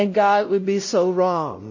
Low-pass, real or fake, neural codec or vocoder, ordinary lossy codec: 7.2 kHz; fake; codec, 16 kHz, 0.5 kbps, X-Codec, WavLM features, trained on Multilingual LibriSpeech; MP3, 32 kbps